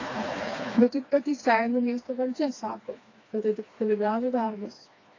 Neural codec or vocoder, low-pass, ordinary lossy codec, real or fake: codec, 16 kHz, 2 kbps, FreqCodec, smaller model; 7.2 kHz; AAC, 32 kbps; fake